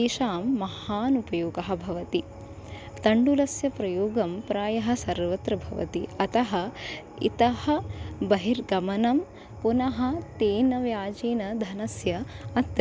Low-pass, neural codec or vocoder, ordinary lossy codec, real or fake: none; none; none; real